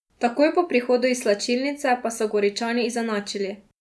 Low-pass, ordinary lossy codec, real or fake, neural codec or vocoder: none; none; real; none